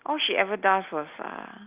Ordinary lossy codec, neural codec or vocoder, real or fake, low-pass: Opus, 24 kbps; none; real; 3.6 kHz